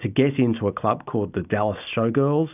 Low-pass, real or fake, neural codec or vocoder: 3.6 kHz; real; none